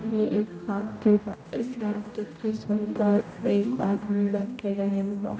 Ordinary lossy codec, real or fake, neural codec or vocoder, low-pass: none; fake; codec, 16 kHz, 0.5 kbps, X-Codec, HuBERT features, trained on general audio; none